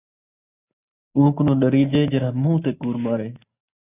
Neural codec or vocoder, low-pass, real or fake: none; 3.6 kHz; real